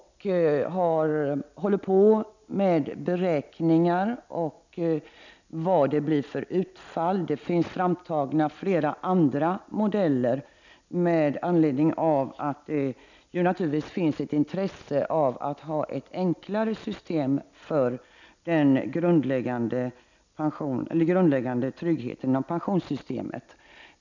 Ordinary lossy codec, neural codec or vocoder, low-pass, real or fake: none; none; 7.2 kHz; real